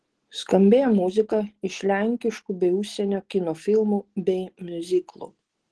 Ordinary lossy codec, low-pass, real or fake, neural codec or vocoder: Opus, 16 kbps; 10.8 kHz; real; none